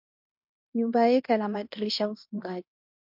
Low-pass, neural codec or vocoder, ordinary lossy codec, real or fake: 5.4 kHz; codec, 16 kHz in and 24 kHz out, 0.9 kbps, LongCat-Audio-Codec, fine tuned four codebook decoder; MP3, 48 kbps; fake